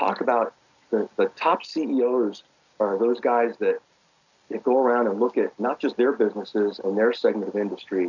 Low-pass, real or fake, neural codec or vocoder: 7.2 kHz; real; none